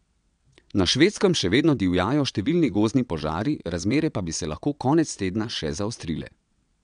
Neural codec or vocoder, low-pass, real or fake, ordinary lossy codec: vocoder, 22.05 kHz, 80 mel bands, WaveNeXt; 9.9 kHz; fake; none